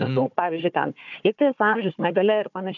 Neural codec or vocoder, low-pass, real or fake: codec, 16 kHz, 4 kbps, FunCodec, trained on Chinese and English, 50 frames a second; 7.2 kHz; fake